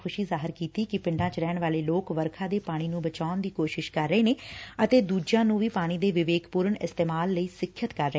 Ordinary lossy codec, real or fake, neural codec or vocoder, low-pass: none; real; none; none